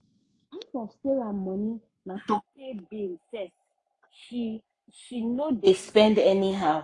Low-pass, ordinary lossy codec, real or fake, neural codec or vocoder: 10.8 kHz; AAC, 48 kbps; fake; codec, 44.1 kHz, 7.8 kbps, Pupu-Codec